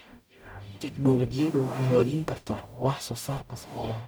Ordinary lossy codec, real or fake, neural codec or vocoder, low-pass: none; fake; codec, 44.1 kHz, 0.9 kbps, DAC; none